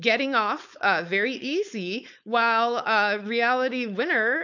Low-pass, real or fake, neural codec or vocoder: 7.2 kHz; fake; codec, 16 kHz, 4.8 kbps, FACodec